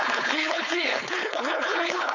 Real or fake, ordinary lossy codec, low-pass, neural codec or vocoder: fake; none; 7.2 kHz; codec, 16 kHz, 4.8 kbps, FACodec